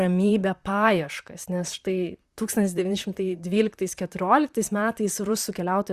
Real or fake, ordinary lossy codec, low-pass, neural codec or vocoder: fake; Opus, 64 kbps; 14.4 kHz; vocoder, 44.1 kHz, 128 mel bands, Pupu-Vocoder